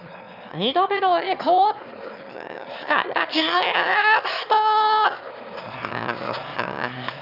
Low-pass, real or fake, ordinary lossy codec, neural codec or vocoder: 5.4 kHz; fake; none; autoencoder, 22.05 kHz, a latent of 192 numbers a frame, VITS, trained on one speaker